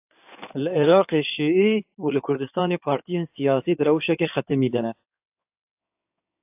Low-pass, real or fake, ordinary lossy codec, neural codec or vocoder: 3.6 kHz; fake; AAC, 32 kbps; codec, 16 kHz in and 24 kHz out, 2.2 kbps, FireRedTTS-2 codec